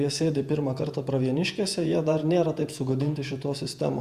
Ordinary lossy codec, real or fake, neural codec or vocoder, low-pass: Opus, 64 kbps; fake; vocoder, 48 kHz, 128 mel bands, Vocos; 14.4 kHz